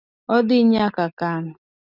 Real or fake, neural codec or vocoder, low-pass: real; none; 5.4 kHz